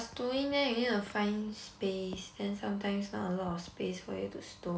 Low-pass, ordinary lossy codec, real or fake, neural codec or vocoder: none; none; real; none